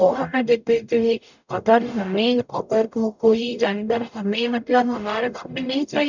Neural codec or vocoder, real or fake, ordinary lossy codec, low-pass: codec, 44.1 kHz, 0.9 kbps, DAC; fake; none; 7.2 kHz